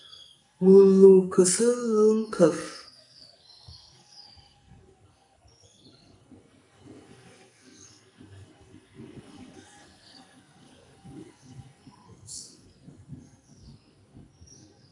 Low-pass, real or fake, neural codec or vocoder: 10.8 kHz; fake; codec, 44.1 kHz, 2.6 kbps, SNAC